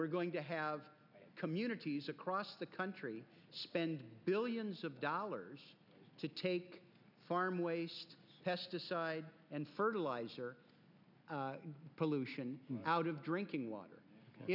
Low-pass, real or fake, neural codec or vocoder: 5.4 kHz; real; none